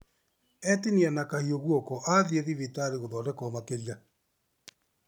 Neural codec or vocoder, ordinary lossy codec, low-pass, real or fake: none; none; none; real